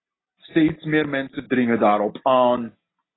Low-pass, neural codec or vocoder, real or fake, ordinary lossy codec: 7.2 kHz; none; real; AAC, 16 kbps